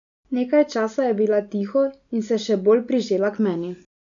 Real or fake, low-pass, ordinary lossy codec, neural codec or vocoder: real; 7.2 kHz; none; none